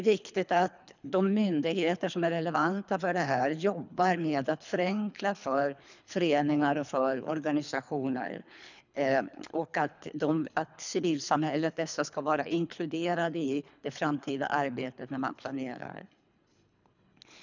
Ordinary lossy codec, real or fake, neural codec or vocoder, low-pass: none; fake; codec, 24 kHz, 3 kbps, HILCodec; 7.2 kHz